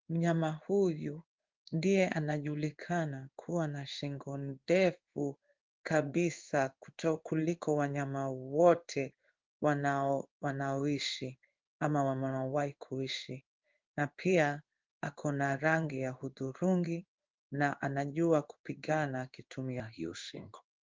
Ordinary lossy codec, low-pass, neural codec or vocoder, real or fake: Opus, 32 kbps; 7.2 kHz; codec, 16 kHz in and 24 kHz out, 1 kbps, XY-Tokenizer; fake